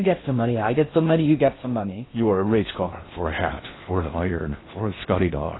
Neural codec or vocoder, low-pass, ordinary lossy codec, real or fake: codec, 16 kHz in and 24 kHz out, 0.6 kbps, FocalCodec, streaming, 4096 codes; 7.2 kHz; AAC, 16 kbps; fake